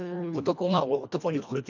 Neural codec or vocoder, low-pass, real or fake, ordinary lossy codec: codec, 24 kHz, 1.5 kbps, HILCodec; 7.2 kHz; fake; none